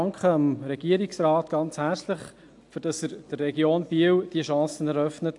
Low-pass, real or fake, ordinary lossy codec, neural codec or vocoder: 10.8 kHz; real; AAC, 64 kbps; none